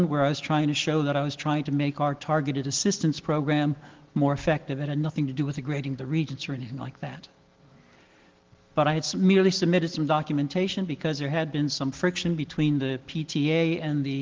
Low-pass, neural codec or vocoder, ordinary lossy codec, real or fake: 7.2 kHz; none; Opus, 16 kbps; real